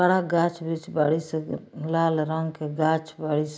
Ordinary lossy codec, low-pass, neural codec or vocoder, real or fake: none; none; none; real